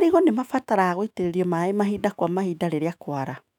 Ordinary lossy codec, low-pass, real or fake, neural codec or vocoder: none; 19.8 kHz; fake; autoencoder, 48 kHz, 128 numbers a frame, DAC-VAE, trained on Japanese speech